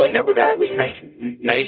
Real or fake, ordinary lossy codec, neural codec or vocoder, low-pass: fake; none; codec, 44.1 kHz, 0.9 kbps, DAC; 5.4 kHz